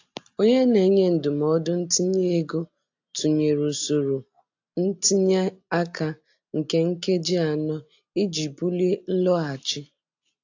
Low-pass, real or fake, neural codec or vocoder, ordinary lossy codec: 7.2 kHz; real; none; AAC, 48 kbps